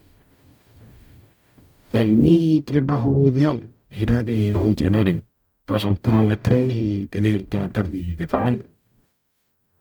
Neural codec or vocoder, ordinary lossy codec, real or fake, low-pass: codec, 44.1 kHz, 0.9 kbps, DAC; none; fake; none